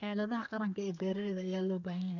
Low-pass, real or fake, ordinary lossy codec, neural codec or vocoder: 7.2 kHz; fake; none; codec, 32 kHz, 1.9 kbps, SNAC